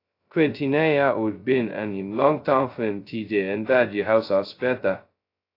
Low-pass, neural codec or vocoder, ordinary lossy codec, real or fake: 5.4 kHz; codec, 16 kHz, 0.2 kbps, FocalCodec; AAC, 32 kbps; fake